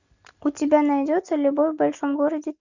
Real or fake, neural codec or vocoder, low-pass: real; none; 7.2 kHz